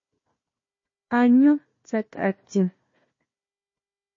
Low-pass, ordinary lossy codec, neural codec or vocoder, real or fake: 7.2 kHz; MP3, 32 kbps; codec, 16 kHz, 1 kbps, FunCodec, trained on Chinese and English, 50 frames a second; fake